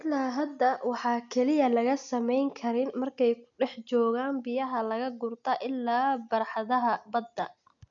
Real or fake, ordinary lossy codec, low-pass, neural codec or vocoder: real; none; 7.2 kHz; none